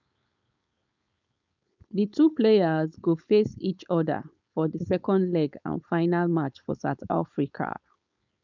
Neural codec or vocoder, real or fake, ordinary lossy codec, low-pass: codec, 16 kHz, 4.8 kbps, FACodec; fake; none; 7.2 kHz